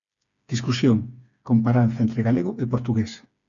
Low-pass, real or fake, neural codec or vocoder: 7.2 kHz; fake; codec, 16 kHz, 4 kbps, FreqCodec, smaller model